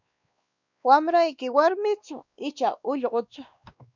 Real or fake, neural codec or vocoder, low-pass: fake; codec, 16 kHz, 2 kbps, X-Codec, WavLM features, trained on Multilingual LibriSpeech; 7.2 kHz